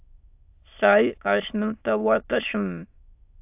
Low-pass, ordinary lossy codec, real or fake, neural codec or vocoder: 3.6 kHz; AAC, 32 kbps; fake; autoencoder, 22.05 kHz, a latent of 192 numbers a frame, VITS, trained on many speakers